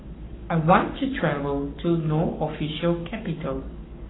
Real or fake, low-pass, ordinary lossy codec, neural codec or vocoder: fake; 7.2 kHz; AAC, 16 kbps; codec, 44.1 kHz, 7.8 kbps, Pupu-Codec